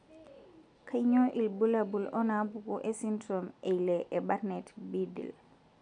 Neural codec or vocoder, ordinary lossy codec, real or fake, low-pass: none; none; real; 9.9 kHz